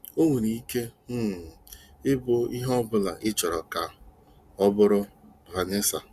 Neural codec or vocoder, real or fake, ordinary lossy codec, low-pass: none; real; Opus, 64 kbps; 14.4 kHz